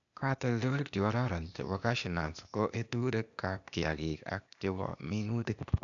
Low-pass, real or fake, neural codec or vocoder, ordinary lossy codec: 7.2 kHz; fake; codec, 16 kHz, 0.8 kbps, ZipCodec; none